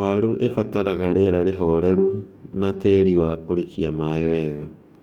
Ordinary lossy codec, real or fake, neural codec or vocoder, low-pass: none; fake; codec, 44.1 kHz, 2.6 kbps, DAC; 19.8 kHz